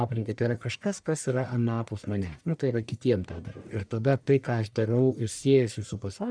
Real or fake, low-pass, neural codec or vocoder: fake; 9.9 kHz; codec, 44.1 kHz, 1.7 kbps, Pupu-Codec